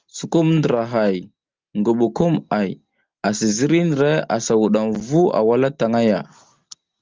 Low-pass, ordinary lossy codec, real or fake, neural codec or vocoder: 7.2 kHz; Opus, 32 kbps; real; none